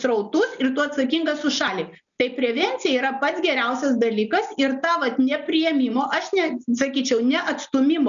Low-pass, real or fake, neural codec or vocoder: 7.2 kHz; real; none